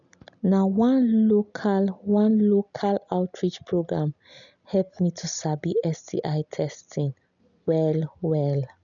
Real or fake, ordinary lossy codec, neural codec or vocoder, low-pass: real; none; none; 7.2 kHz